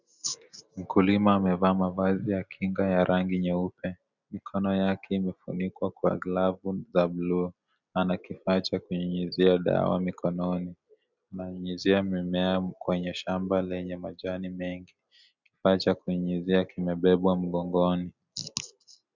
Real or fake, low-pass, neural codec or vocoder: real; 7.2 kHz; none